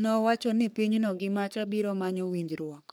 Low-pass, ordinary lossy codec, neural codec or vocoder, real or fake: none; none; codec, 44.1 kHz, 3.4 kbps, Pupu-Codec; fake